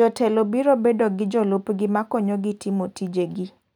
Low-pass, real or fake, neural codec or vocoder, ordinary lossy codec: 19.8 kHz; real; none; none